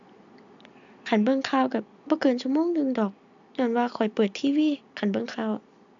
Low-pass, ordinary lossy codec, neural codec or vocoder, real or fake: 7.2 kHz; MP3, 64 kbps; none; real